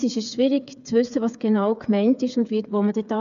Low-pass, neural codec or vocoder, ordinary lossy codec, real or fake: 7.2 kHz; codec, 16 kHz, 8 kbps, FreqCodec, smaller model; none; fake